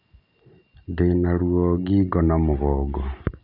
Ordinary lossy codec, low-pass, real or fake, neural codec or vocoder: none; 5.4 kHz; real; none